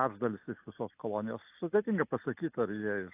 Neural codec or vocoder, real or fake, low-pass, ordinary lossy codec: none; real; 3.6 kHz; AAC, 24 kbps